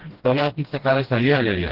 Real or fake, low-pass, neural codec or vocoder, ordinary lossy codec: fake; 5.4 kHz; codec, 16 kHz, 1 kbps, FreqCodec, smaller model; Opus, 16 kbps